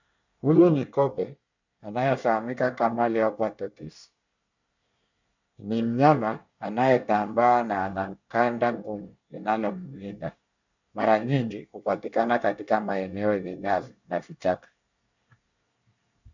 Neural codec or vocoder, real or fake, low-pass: codec, 24 kHz, 1 kbps, SNAC; fake; 7.2 kHz